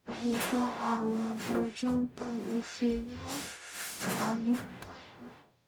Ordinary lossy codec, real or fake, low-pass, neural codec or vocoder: none; fake; none; codec, 44.1 kHz, 0.9 kbps, DAC